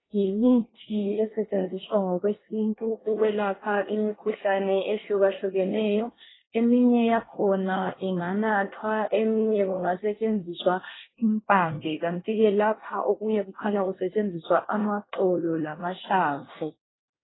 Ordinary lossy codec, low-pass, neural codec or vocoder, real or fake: AAC, 16 kbps; 7.2 kHz; codec, 24 kHz, 1 kbps, SNAC; fake